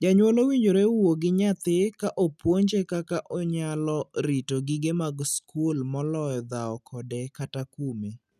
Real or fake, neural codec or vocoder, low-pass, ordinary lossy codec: real; none; 14.4 kHz; none